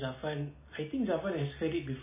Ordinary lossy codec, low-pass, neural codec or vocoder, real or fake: MP3, 16 kbps; 3.6 kHz; none; real